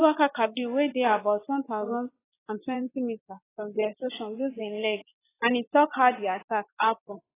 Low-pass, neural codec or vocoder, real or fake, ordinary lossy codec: 3.6 kHz; vocoder, 24 kHz, 100 mel bands, Vocos; fake; AAC, 16 kbps